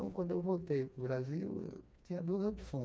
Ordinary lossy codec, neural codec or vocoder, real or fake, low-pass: none; codec, 16 kHz, 2 kbps, FreqCodec, smaller model; fake; none